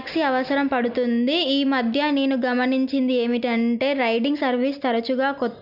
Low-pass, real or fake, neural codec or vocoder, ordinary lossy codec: 5.4 kHz; real; none; none